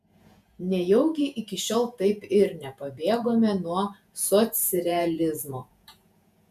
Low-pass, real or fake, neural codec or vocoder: 14.4 kHz; fake; vocoder, 48 kHz, 128 mel bands, Vocos